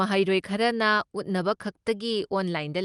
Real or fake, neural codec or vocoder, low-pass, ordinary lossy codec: real; none; 10.8 kHz; Opus, 24 kbps